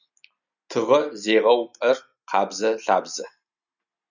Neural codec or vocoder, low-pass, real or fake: none; 7.2 kHz; real